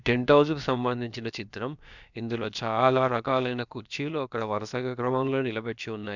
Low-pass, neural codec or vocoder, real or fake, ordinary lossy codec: 7.2 kHz; codec, 16 kHz, about 1 kbps, DyCAST, with the encoder's durations; fake; none